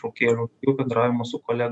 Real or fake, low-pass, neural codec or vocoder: real; 10.8 kHz; none